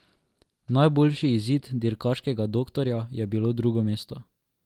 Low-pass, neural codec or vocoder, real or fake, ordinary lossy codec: 19.8 kHz; none; real; Opus, 24 kbps